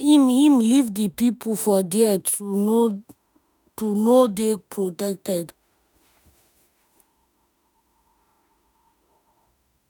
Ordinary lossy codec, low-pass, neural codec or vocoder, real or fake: none; none; autoencoder, 48 kHz, 32 numbers a frame, DAC-VAE, trained on Japanese speech; fake